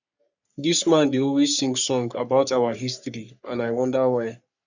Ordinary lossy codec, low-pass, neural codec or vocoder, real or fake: none; 7.2 kHz; codec, 44.1 kHz, 3.4 kbps, Pupu-Codec; fake